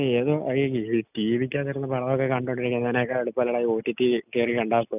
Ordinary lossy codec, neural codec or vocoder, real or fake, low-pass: none; none; real; 3.6 kHz